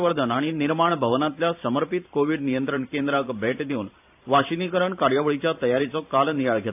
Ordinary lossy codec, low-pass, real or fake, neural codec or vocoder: AAC, 32 kbps; 3.6 kHz; fake; vocoder, 44.1 kHz, 128 mel bands every 512 samples, BigVGAN v2